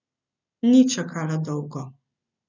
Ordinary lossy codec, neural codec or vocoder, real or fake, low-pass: none; none; real; 7.2 kHz